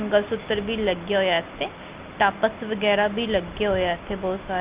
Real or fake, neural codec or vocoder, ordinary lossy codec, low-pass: real; none; Opus, 32 kbps; 3.6 kHz